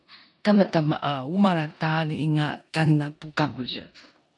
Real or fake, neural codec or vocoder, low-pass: fake; codec, 16 kHz in and 24 kHz out, 0.9 kbps, LongCat-Audio-Codec, four codebook decoder; 10.8 kHz